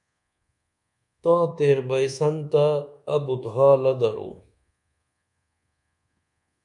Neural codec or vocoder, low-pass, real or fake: codec, 24 kHz, 1.2 kbps, DualCodec; 10.8 kHz; fake